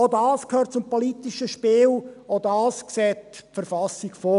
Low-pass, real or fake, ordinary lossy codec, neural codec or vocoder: 10.8 kHz; real; none; none